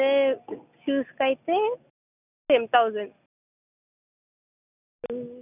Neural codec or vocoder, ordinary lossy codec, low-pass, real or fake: none; none; 3.6 kHz; real